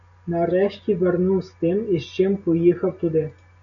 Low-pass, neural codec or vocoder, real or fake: 7.2 kHz; none; real